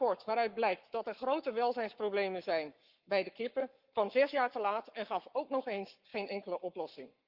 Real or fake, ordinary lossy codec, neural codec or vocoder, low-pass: fake; Opus, 32 kbps; codec, 44.1 kHz, 7.8 kbps, Pupu-Codec; 5.4 kHz